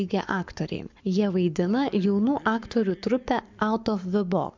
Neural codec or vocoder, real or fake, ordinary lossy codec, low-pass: codec, 44.1 kHz, 7.8 kbps, Pupu-Codec; fake; MP3, 64 kbps; 7.2 kHz